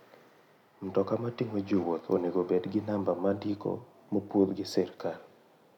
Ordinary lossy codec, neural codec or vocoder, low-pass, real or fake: none; none; 19.8 kHz; real